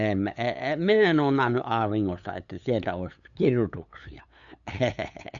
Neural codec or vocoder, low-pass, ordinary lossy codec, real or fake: codec, 16 kHz, 8 kbps, FunCodec, trained on LibriTTS, 25 frames a second; 7.2 kHz; none; fake